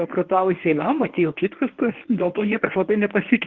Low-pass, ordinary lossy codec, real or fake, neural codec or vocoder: 7.2 kHz; Opus, 16 kbps; fake; codec, 24 kHz, 0.9 kbps, WavTokenizer, medium speech release version 1